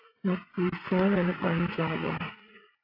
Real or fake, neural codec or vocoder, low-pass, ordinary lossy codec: fake; vocoder, 44.1 kHz, 80 mel bands, Vocos; 5.4 kHz; AAC, 32 kbps